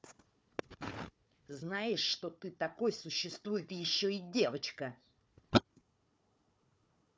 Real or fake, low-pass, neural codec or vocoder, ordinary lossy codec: fake; none; codec, 16 kHz, 4 kbps, FunCodec, trained on Chinese and English, 50 frames a second; none